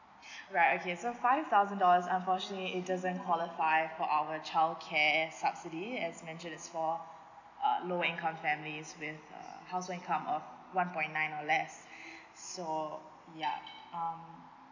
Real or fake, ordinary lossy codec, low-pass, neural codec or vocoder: real; none; 7.2 kHz; none